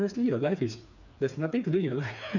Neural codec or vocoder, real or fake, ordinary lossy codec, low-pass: codec, 16 kHz, 4 kbps, FreqCodec, smaller model; fake; none; 7.2 kHz